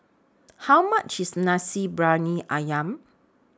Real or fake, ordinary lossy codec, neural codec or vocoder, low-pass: real; none; none; none